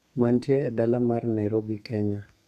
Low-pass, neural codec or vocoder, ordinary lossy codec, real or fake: 14.4 kHz; codec, 32 kHz, 1.9 kbps, SNAC; none; fake